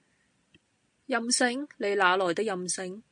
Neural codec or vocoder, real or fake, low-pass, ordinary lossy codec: none; real; 9.9 kHz; MP3, 48 kbps